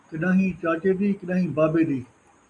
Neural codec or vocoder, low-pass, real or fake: none; 10.8 kHz; real